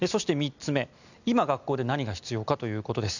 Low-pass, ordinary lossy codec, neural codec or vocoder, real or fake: 7.2 kHz; none; none; real